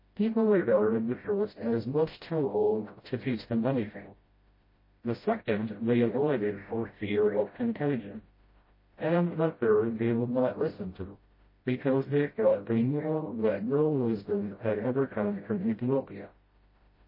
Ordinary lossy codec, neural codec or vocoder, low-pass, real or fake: MP3, 24 kbps; codec, 16 kHz, 0.5 kbps, FreqCodec, smaller model; 5.4 kHz; fake